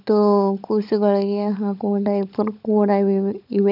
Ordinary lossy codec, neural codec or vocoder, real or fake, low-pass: none; codec, 16 kHz, 16 kbps, FunCodec, trained on LibriTTS, 50 frames a second; fake; 5.4 kHz